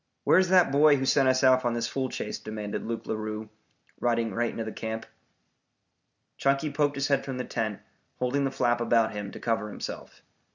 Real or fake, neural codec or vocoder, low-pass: real; none; 7.2 kHz